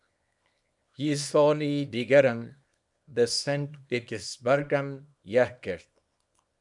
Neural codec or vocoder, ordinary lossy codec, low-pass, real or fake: codec, 24 kHz, 0.9 kbps, WavTokenizer, small release; MP3, 96 kbps; 10.8 kHz; fake